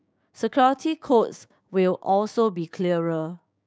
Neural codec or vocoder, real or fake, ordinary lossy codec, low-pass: codec, 16 kHz, 6 kbps, DAC; fake; none; none